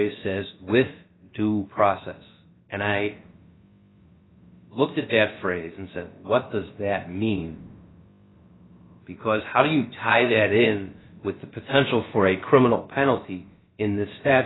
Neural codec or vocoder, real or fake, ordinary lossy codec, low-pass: codec, 16 kHz, about 1 kbps, DyCAST, with the encoder's durations; fake; AAC, 16 kbps; 7.2 kHz